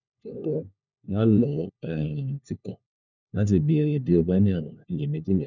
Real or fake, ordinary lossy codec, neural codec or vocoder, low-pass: fake; none; codec, 16 kHz, 1 kbps, FunCodec, trained on LibriTTS, 50 frames a second; 7.2 kHz